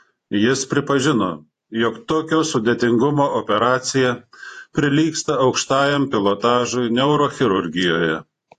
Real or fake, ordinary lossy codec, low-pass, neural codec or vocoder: fake; AAC, 48 kbps; 14.4 kHz; vocoder, 48 kHz, 128 mel bands, Vocos